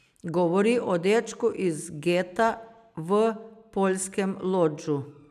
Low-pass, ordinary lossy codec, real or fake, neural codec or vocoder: 14.4 kHz; none; real; none